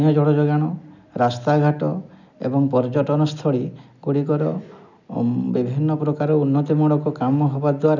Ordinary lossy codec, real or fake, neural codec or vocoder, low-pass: none; real; none; 7.2 kHz